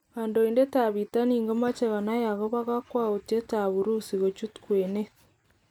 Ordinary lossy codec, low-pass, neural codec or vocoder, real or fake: none; 19.8 kHz; none; real